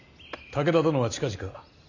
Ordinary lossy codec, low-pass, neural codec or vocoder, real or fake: none; 7.2 kHz; none; real